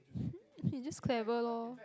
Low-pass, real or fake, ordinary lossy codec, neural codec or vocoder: none; real; none; none